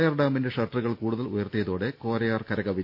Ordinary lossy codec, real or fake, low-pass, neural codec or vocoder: none; real; 5.4 kHz; none